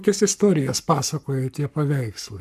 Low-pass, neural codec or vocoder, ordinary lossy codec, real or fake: 14.4 kHz; codec, 44.1 kHz, 7.8 kbps, Pupu-Codec; MP3, 96 kbps; fake